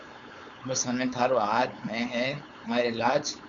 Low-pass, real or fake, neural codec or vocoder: 7.2 kHz; fake; codec, 16 kHz, 4.8 kbps, FACodec